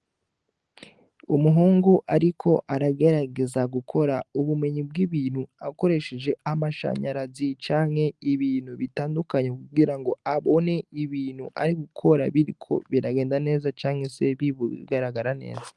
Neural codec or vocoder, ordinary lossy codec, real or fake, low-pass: none; Opus, 24 kbps; real; 10.8 kHz